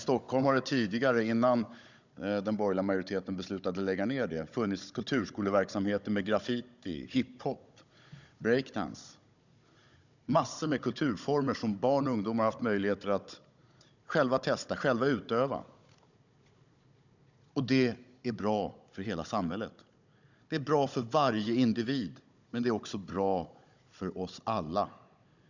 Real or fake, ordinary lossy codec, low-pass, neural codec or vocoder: fake; none; 7.2 kHz; codec, 16 kHz, 16 kbps, FunCodec, trained on Chinese and English, 50 frames a second